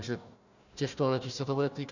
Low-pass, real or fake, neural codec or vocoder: 7.2 kHz; fake; codec, 16 kHz, 1 kbps, FunCodec, trained on Chinese and English, 50 frames a second